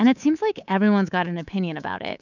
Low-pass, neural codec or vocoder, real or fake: 7.2 kHz; codec, 24 kHz, 3.1 kbps, DualCodec; fake